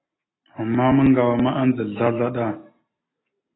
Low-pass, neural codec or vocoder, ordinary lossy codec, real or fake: 7.2 kHz; none; AAC, 16 kbps; real